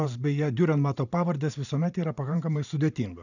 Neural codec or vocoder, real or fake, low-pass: vocoder, 44.1 kHz, 128 mel bands every 512 samples, BigVGAN v2; fake; 7.2 kHz